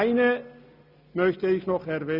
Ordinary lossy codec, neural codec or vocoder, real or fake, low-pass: none; none; real; 5.4 kHz